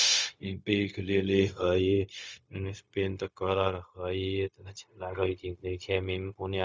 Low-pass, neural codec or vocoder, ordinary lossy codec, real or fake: none; codec, 16 kHz, 0.4 kbps, LongCat-Audio-Codec; none; fake